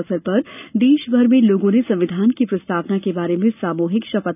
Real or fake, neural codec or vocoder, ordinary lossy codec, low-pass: real; none; none; 3.6 kHz